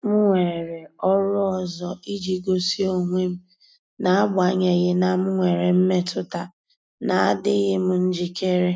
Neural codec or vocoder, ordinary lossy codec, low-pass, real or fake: none; none; none; real